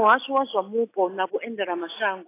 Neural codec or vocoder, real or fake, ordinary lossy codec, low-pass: none; real; AAC, 24 kbps; 3.6 kHz